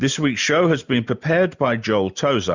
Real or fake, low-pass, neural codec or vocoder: real; 7.2 kHz; none